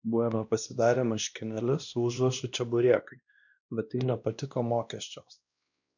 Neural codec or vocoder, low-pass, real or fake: codec, 16 kHz, 1 kbps, X-Codec, WavLM features, trained on Multilingual LibriSpeech; 7.2 kHz; fake